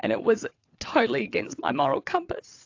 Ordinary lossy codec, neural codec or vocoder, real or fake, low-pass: AAC, 48 kbps; vocoder, 22.05 kHz, 80 mel bands, WaveNeXt; fake; 7.2 kHz